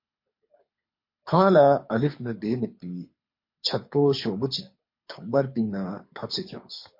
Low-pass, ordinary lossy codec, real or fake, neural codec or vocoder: 5.4 kHz; MP3, 32 kbps; fake; codec, 24 kHz, 6 kbps, HILCodec